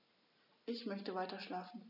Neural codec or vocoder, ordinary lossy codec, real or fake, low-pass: none; none; real; 5.4 kHz